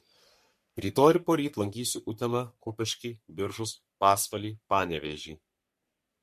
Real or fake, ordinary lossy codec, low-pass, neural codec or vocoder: fake; MP3, 64 kbps; 14.4 kHz; codec, 44.1 kHz, 3.4 kbps, Pupu-Codec